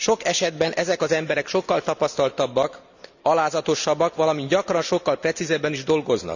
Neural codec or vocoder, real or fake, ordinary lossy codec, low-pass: none; real; none; 7.2 kHz